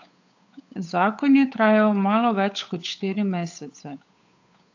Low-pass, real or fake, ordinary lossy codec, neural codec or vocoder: 7.2 kHz; fake; AAC, 48 kbps; codec, 16 kHz, 8 kbps, FunCodec, trained on Chinese and English, 25 frames a second